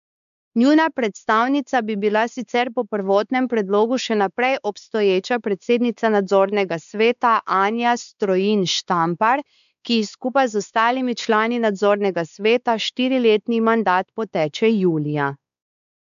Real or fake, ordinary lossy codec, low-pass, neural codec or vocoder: fake; none; 7.2 kHz; codec, 16 kHz, 4 kbps, X-Codec, WavLM features, trained on Multilingual LibriSpeech